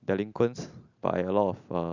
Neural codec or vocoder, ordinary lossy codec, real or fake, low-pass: none; none; real; 7.2 kHz